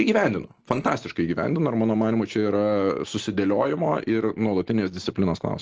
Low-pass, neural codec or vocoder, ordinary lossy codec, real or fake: 7.2 kHz; none; Opus, 16 kbps; real